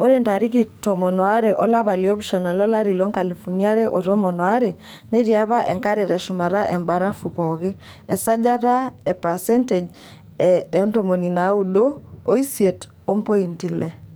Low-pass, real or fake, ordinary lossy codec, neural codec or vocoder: none; fake; none; codec, 44.1 kHz, 2.6 kbps, SNAC